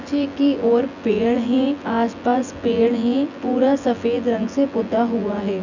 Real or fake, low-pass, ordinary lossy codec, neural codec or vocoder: fake; 7.2 kHz; none; vocoder, 24 kHz, 100 mel bands, Vocos